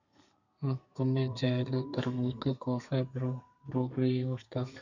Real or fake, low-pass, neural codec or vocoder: fake; 7.2 kHz; codec, 32 kHz, 1.9 kbps, SNAC